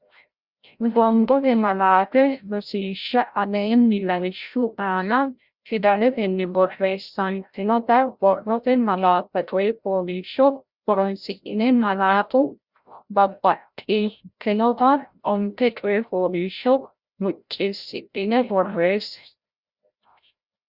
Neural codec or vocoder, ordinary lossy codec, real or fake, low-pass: codec, 16 kHz, 0.5 kbps, FreqCodec, larger model; Opus, 64 kbps; fake; 5.4 kHz